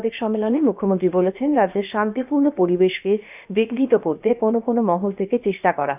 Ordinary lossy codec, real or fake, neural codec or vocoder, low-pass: none; fake; codec, 16 kHz, about 1 kbps, DyCAST, with the encoder's durations; 3.6 kHz